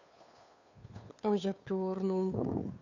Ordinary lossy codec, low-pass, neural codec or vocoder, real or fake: none; 7.2 kHz; codec, 16 kHz, 2 kbps, FunCodec, trained on Chinese and English, 25 frames a second; fake